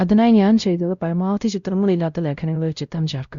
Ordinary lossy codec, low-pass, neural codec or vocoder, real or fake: Opus, 64 kbps; 7.2 kHz; codec, 16 kHz, 0.5 kbps, X-Codec, WavLM features, trained on Multilingual LibriSpeech; fake